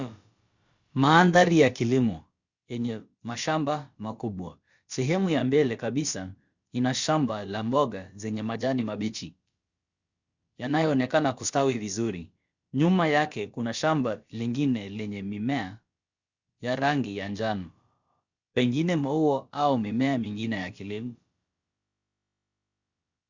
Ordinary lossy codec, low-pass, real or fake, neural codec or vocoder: Opus, 64 kbps; 7.2 kHz; fake; codec, 16 kHz, about 1 kbps, DyCAST, with the encoder's durations